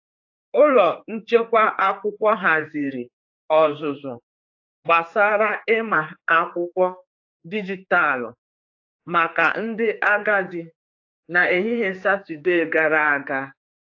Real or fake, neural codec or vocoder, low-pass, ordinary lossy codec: fake; codec, 16 kHz, 4 kbps, X-Codec, HuBERT features, trained on general audio; 7.2 kHz; AAC, 48 kbps